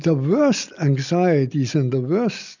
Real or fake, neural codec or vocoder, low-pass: real; none; 7.2 kHz